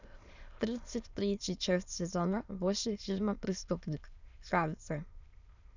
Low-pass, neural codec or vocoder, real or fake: 7.2 kHz; autoencoder, 22.05 kHz, a latent of 192 numbers a frame, VITS, trained on many speakers; fake